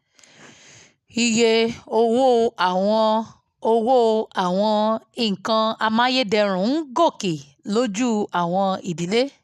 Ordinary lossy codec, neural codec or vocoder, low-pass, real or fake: none; none; 14.4 kHz; real